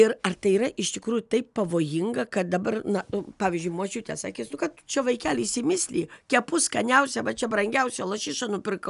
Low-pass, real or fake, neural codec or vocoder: 10.8 kHz; real; none